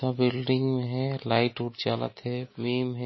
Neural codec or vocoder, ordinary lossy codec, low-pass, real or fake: none; MP3, 24 kbps; 7.2 kHz; real